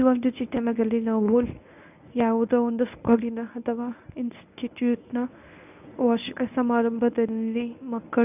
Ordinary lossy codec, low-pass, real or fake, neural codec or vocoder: none; 3.6 kHz; fake; codec, 24 kHz, 0.9 kbps, WavTokenizer, medium speech release version 1